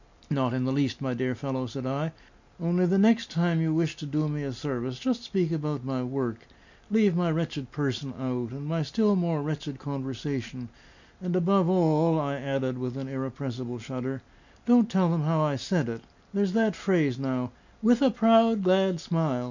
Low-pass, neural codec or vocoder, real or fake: 7.2 kHz; none; real